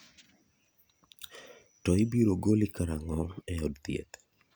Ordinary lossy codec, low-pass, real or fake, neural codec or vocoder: none; none; real; none